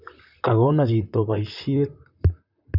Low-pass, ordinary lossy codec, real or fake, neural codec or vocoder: 5.4 kHz; none; fake; vocoder, 44.1 kHz, 128 mel bands, Pupu-Vocoder